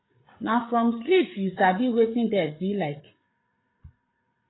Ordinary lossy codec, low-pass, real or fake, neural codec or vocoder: AAC, 16 kbps; 7.2 kHz; fake; codec, 16 kHz, 8 kbps, FreqCodec, larger model